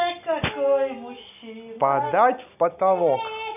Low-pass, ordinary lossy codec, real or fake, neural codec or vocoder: 3.6 kHz; none; real; none